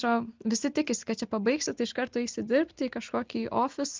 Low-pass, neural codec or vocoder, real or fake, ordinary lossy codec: 7.2 kHz; none; real; Opus, 24 kbps